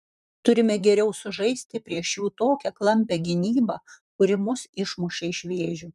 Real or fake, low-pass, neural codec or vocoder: fake; 14.4 kHz; vocoder, 44.1 kHz, 128 mel bands, Pupu-Vocoder